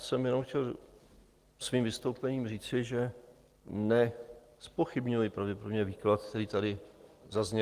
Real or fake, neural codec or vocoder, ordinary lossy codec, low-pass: real; none; Opus, 24 kbps; 14.4 kHz